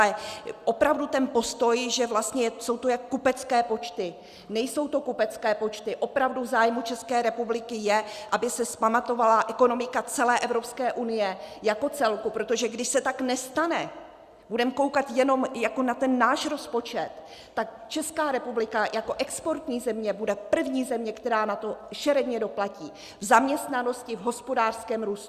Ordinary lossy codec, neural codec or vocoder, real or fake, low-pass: Opus, 64 kbps; none; real; 14.4 kHz